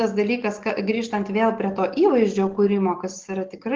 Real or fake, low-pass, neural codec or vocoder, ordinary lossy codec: real; 7.2 kHz; none; Opus, 16 kbps